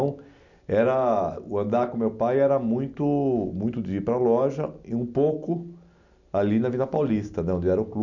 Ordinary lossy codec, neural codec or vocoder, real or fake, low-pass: none; none; real; 7.2 kHz